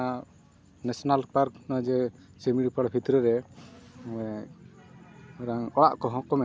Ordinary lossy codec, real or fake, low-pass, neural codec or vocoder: none; real; none; none